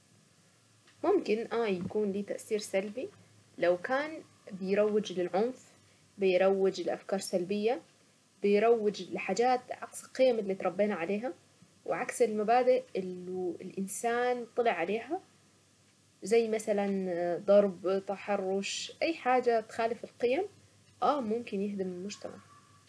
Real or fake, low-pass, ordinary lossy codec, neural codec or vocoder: real; none; none; none